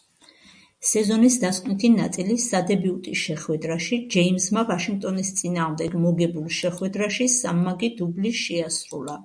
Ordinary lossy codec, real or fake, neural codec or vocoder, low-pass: MP3, 96 kbps; real; none; 9.9 kHz